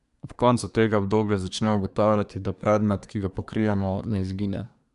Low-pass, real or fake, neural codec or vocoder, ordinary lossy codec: 10.8 kHz; fake; codec, 24 kHz, 1 kbps, SNAC; none